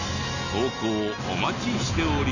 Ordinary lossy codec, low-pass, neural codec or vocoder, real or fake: none; 7.2 kHz; none; real